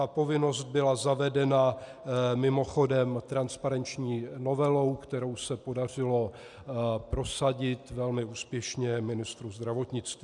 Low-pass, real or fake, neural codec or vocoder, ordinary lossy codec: 10.8 kHz; real; none; MP3, 96 kbps